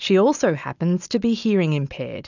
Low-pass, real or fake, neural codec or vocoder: 7.2 kHz; real; none